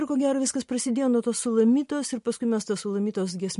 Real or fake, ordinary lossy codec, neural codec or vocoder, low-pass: real; MP3, 48 kbps; none; 10.8 kHz